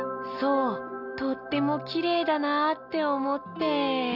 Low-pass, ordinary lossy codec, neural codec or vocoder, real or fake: 5.4 kHz; none; none; real